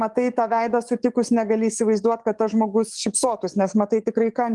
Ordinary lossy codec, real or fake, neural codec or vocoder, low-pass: Opus, 24 kbps; fake; codec, 44.1 kHz, 7.8 kbps, DAC; 10.8 kHz